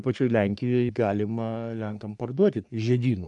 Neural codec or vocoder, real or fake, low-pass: codec, 44.1 kHz, 3.4 kbps, Pupu-Codec; fake; 10.8 kHz